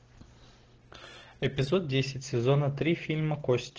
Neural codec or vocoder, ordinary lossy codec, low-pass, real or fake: none; Opus, 16 kbps; 7.2 kHz; real